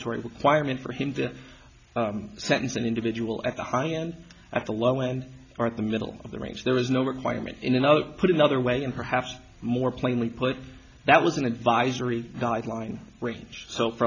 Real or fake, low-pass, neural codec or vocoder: real; 7.2 kHz; none